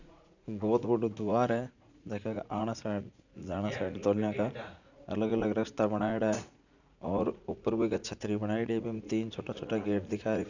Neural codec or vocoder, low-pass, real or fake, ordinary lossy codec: vocoder, 44.1 kHz, 80 mel bands, Vocos; 7.2 kHz; fake; none